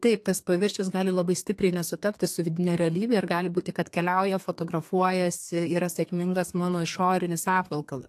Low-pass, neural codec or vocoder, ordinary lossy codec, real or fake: 14.4 kHz; codec, 32 kHz, 1.9 kbps, SNAC; AAC, 64 kbps; fake